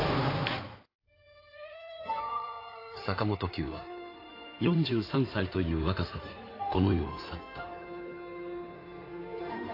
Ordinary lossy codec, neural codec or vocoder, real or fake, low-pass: AAC, 32 kbps; codec, 16 kHz in and 24 kHz out, 2.2 kbps, FireRedTTS-2 codec; fake; 5.4 kHz